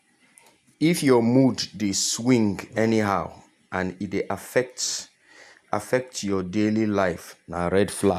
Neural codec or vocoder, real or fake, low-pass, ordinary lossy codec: none; real; 14.4 kHz; none